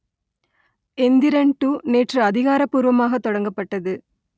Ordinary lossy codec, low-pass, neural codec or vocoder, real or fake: none; none; none; real